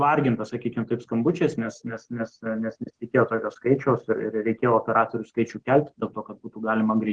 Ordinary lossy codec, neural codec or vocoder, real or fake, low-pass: Opus, 16 kbps; none; real; 9.9 kHz